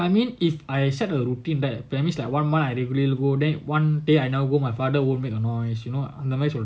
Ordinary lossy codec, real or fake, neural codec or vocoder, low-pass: none; real; none; none